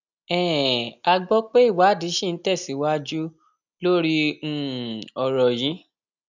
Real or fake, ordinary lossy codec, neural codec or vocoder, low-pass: real; none; none; 7.2 kHz